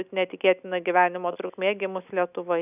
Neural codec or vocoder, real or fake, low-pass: none; real; 3.6 kHz